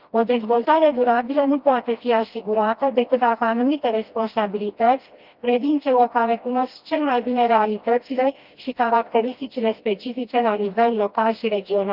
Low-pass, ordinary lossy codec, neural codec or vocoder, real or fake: 5.4 kHz; Opus, 32 kbps; codec, 16 kHz, 1 kbps, FreqCodec, smaller model; fake